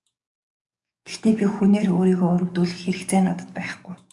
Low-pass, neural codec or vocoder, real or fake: 10.8 kHz; codec, 44.1 kHz, 7.8 kbps, DAC; fake